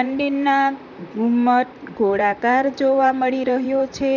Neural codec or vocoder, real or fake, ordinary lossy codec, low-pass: vocoder, 44.1 kHz, 128 mel bands, Pupu-Vocoder; fake; none; 7.2 kHz